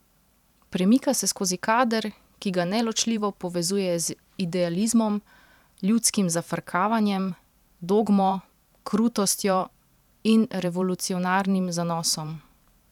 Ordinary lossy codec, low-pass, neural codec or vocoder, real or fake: none; 19.8 kHz; vocoder, 44.1 kHz, 128 mel bands every 512 samples, BigVGAN v2; fake